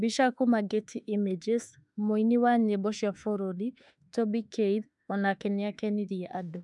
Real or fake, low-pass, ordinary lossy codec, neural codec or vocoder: fake; 10.8 kHz; none; autoencoder, 48 kHz, 32 numbers a frame, DAC-VAE, trained on Japanese speech